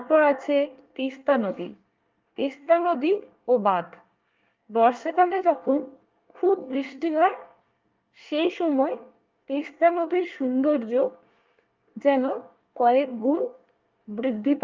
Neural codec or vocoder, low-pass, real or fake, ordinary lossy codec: codec, 24 kHz, 1 kbps, SNAC; 7.2 kHz; fake; Opus, 24 kbps